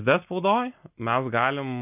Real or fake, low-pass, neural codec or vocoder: real; 3.6 kHz; none